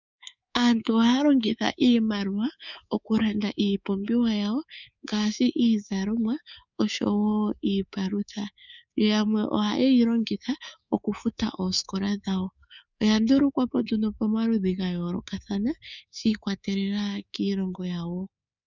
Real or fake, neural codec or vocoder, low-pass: fake; codec, 24 kHz, 3.1 kbps, DualCodec; 7.2 kHz